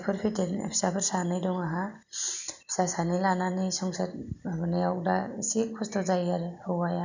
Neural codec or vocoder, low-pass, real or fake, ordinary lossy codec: none; 7.2 kHz; real; none